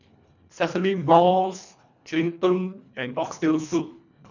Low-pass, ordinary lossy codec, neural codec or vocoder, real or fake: 7.2 kHz; none; codec, 24 kHz, 1.5 kbps, HILCodec; fake